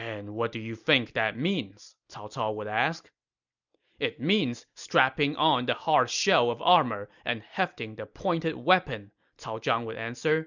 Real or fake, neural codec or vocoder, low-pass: real; none; 7.2 kHz